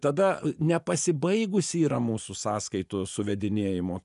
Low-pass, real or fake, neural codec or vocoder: 10.8 kHz; fake; vocoder, 24 kHz, 100 mel bands, Vocos